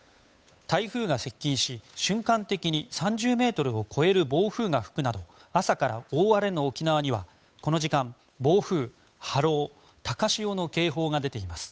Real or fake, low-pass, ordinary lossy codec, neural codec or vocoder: fake; none; none; codec, 16 kHz, 8 kbps, FunCodec, trained on Chinese and English, 25 frames a second